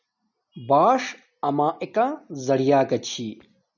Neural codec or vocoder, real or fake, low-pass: none; real; 7.2 kHz